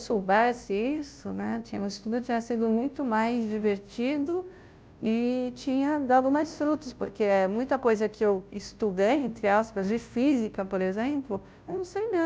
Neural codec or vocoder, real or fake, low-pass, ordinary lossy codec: codec, 16 kHz, 0.5 kbps, FunCodec, trained on Chinese and English, 25 frames a second; fake; none; none